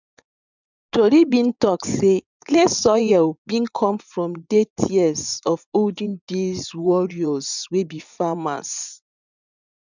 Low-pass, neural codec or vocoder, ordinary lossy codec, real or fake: 7.2 kHz; vocoder, 24 kHz, 100 mel bands, Vocos; none; fake